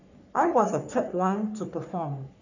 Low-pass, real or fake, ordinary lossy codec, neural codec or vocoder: 7.2 kHz; fake; none; codec, 44.1 kHz, 3.4 kbps, Pupu-Codec